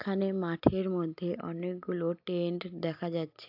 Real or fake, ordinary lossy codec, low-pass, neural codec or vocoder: real; AAC, 48 kbps; 5.4 kHz; none